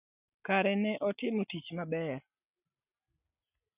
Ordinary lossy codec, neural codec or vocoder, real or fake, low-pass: none; none; real; 3.6 kHz